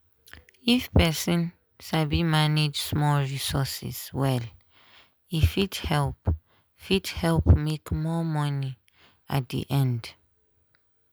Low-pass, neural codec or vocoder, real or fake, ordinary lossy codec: none; none; real; none